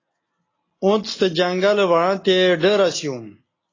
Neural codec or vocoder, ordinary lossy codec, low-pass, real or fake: none; AAC, 32 kbps; 7.2 kHz; real